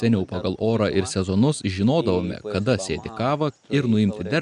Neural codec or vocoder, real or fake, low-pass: none; real; 10.8 kHz